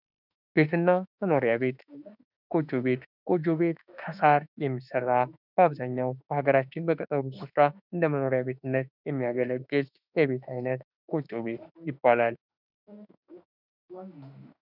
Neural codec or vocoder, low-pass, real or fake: autoencoder, 48 kHz, 32 numbers a frame, DAC-VAE, trained on Japanese speech; 5.4 kHz; fake